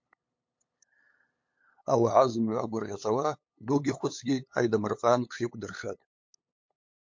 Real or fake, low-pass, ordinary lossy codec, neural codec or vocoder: fake; 7.2 kHz; MP3, 48 kbps; codec, 16 kHz, 8 kbps, FunCodec, trained on LibriTTS, 25 frames a second